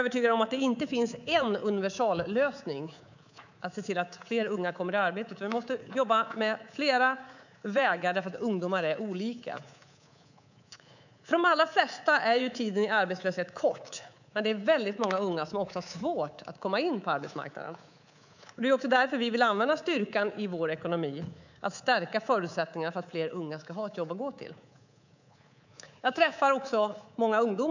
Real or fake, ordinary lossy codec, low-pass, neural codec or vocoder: fake; none; 7.2 kHz; codec, 24 kHz, 3.1 kbps, DualCodec